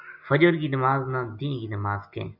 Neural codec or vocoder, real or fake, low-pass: none; real; 5.4 kHz